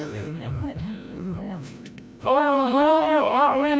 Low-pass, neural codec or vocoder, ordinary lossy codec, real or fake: none; codec, 16 kHz, 0.5 kbps, FreqCodec, larger model; none; fake